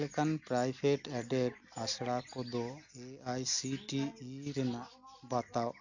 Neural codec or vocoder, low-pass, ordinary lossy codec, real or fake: none; 7.2 kHz; none; real